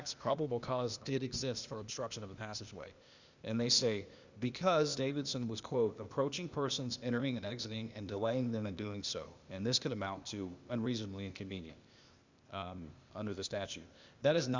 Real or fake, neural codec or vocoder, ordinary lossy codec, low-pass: fake; codec, 16 kHz, 0.8 kbps, ZipCodec; Opus, 64 kbps; 7.2 kHz